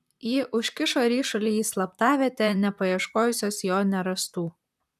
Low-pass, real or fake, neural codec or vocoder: 14.4 kHz; fake; vocoder, 44.1 kHz, 128 mel bands, Pupu-Vocoder